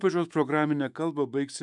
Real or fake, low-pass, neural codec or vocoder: fake; 10.8 kHz; autoencoder, 48 kHz, 128 numbers a frame, DAC-VAE, trained on Japanese speech